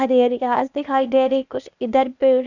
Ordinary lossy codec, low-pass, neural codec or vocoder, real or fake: none; 7.2 kHz; codec, 16 kHz, 0.8 kbps, ZipCodec; fake